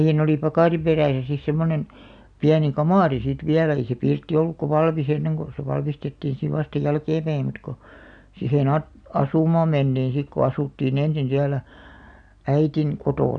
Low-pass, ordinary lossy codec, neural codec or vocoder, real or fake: 9.9 kHz; none; none; real